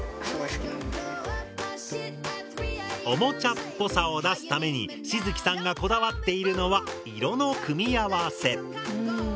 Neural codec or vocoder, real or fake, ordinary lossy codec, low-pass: none; real; none; none